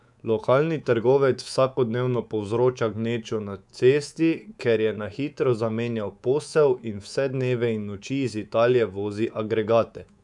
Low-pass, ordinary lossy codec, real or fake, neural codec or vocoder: 10.8 kHz; none; fake; codec, 24 kHz, 3.1 kbps, DualCodec